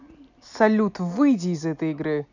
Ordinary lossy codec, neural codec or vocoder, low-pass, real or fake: none; none; 7.2 kHz; real